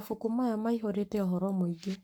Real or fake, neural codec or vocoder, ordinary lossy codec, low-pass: fake; codec, 44.1 kHz, 7.8 kbps, Pupu-Codec; none; none